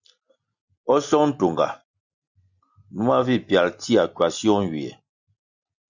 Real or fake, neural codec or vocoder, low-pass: real; none; 7.2 kHz